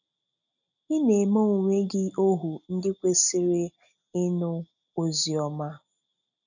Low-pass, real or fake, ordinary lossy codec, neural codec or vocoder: 7.2 kHz; real; none; none